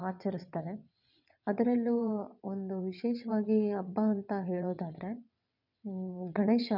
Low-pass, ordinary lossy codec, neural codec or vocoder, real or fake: 5.4 kHz; none; vocoder, 22.05 kHz, 80 mel bands, WaveNeXt; fake